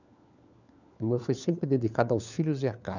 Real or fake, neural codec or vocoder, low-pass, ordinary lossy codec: fake; codec, 16 kHz, 4 kbps, FunCodec, trained on LibriTTS, 50 frames a second; 7.2 kHz; none